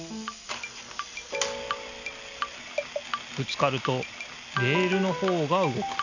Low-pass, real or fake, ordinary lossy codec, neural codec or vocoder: 7.2 kHz; real; none; none